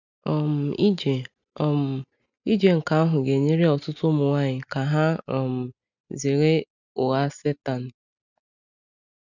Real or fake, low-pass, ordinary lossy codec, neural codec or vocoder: real; 7.2 kHz; none; none